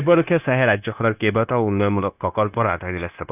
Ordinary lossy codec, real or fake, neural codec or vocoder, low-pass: none; fake; codec, 16 kHz, 0.9 kbps, LongCat-Audio-Codec; 3.6 kHz